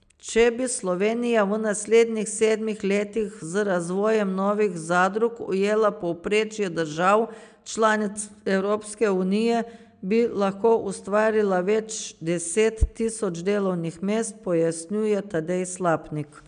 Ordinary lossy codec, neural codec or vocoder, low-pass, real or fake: MP3, 96 kbps; none; 9.9 kHz; real